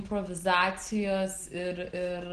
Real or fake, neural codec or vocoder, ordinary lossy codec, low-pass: real; none; Opus, 16 kbps; 9.9 kHz